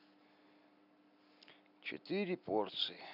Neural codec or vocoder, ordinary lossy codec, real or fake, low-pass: none; none; real; 5.4 kHz